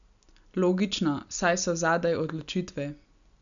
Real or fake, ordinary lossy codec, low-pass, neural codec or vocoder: real; none; 7.2 kHz; none